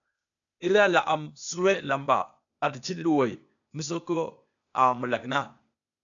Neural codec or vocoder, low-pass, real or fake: codec, 16 kHz, 0.8 kbps, ZipCodec; 7.2 kHz; fake